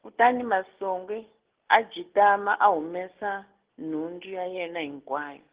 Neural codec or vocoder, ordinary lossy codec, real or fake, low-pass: none; Opus, 16 kbps; real; 3.6 kHz